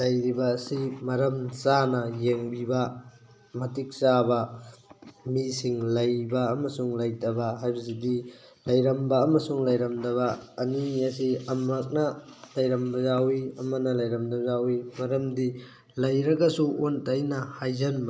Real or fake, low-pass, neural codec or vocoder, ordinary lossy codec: real; none; none; none